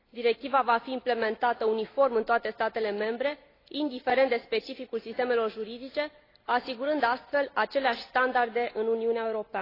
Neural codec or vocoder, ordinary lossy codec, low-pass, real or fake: none; AAC, 24 kbps; 5.4 kHz; real